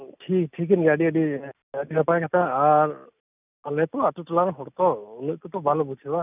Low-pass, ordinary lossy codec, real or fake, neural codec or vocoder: 3.6 kHz; none; real; none